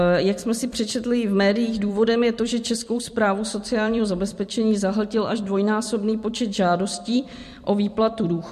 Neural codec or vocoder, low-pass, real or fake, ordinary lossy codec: vocoder, 44.1 kHz, 128 mel bands every 256 samples, BigVGAN v2; 14.4 kHz; fake; MP3, 64 kbps